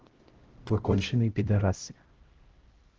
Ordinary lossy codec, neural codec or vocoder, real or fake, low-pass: Opus, 16 kbps; codec, 16 kHz, 0.5 kbps, X-Codec, HuBERT features, trained on LibriSpeech; fake; 7.2 kHz